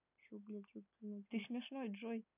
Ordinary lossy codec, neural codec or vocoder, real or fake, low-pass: none; none; real; 3.6 kHz